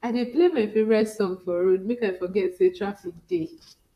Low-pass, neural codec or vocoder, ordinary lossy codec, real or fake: 14.4 kHz; vocoder, 44.1 kHz, 128 mel bands, Pupu-Vocoder; AAC, 96 kbps; fake